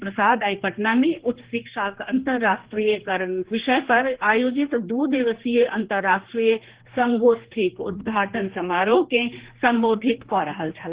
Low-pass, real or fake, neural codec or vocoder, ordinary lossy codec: 3.6 kHz; fake; codec, 16 kHz, 2 kbps, X-Codec, HuBERT features, trained on general audio; Opus, 16 kbps